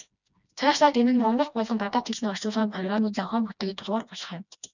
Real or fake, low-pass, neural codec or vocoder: fake; 7.2 kHz; codec, 16 kHz, 1 kbps, FreqCodec, smaller model